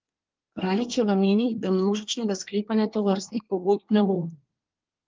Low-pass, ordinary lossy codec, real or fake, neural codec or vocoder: 7.2 kHz; Opus, 32 kbps; fake; codec, 24 kHz, 1 kbps, SNAC